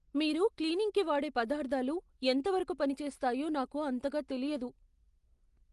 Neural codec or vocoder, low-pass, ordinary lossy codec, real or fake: none; 10.8 kHz; Opus, 24 kbps; real